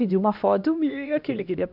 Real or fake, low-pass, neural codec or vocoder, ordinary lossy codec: fake; 5.4 kHz; codec, 16 kHz, 1 kbps, X-Codec, HuBERT features, trained on LibriSpeech; none